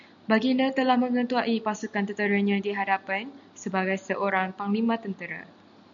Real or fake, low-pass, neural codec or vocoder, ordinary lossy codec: real; 7.2 kHz; none; MP3, 96 kbps